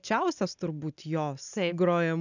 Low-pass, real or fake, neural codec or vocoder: 7.2 kHz; real; none